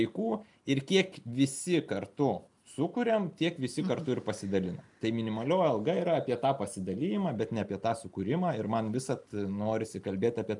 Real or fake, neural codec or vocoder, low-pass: real; none; 10.8 kHz